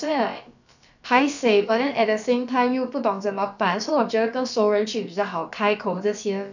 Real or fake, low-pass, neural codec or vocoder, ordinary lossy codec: fake; 7.2 kHz; codec, 16 kHz, about 1 kbps, DyCAST, with the encoder's durations; none